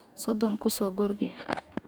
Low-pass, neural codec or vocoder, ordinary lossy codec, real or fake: none; codec, 44.1 kHz, 2.6 kbps, DAC; none; fake